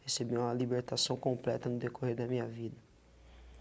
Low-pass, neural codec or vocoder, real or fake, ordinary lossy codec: none; none; real; none